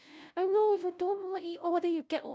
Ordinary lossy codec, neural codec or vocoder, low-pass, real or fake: none; codec, 16 kHz, 0.5 kbps, FunCodec, trained on LibriTTS, 25 frames a second; none; fake